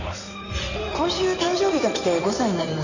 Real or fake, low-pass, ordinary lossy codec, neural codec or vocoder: fake; 7.2 kHz; none; codec, 16 kHz in and 24 kHz out, 2.2 kbps, FireRedTTS-2 codec